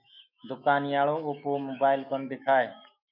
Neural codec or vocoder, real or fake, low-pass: autoencoder, 48 kHz, 128 numbers a frame, DAC-VAE, trained on Japanese speech; fake; 5.4 kHz